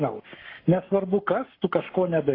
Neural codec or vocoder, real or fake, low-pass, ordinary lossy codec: codec, 44.1 kHz, 7.8 kbps, Pupu-Codec; fake; 5.4 kHz; AAC, 24 kbps